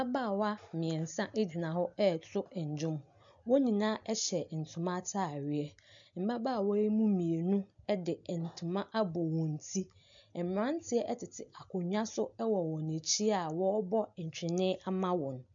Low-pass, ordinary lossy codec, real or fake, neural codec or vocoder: 7.2 kHz; MP3, 64 kbps; real; none